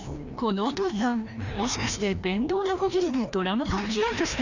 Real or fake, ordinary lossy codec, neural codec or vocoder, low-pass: fake; none; codec, 16 kHz, 1 kbps, FreqCodec, larger model; 7.2 kHz